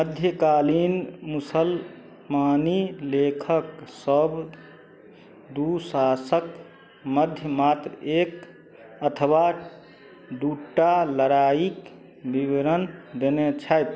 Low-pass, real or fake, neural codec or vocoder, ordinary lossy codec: none; real; none; none